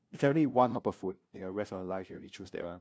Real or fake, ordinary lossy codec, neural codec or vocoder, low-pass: fake; none; codec, 16 kHz, 0.5 kbps, FunCodec, trained on LibriTTS, 25 frames a second; none